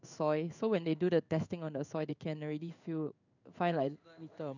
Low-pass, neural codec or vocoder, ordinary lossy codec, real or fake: 7.2 kHz; codec, 16 kHz in and 24 kHz out, 1 kbps, XY-Tokenizer; none; fake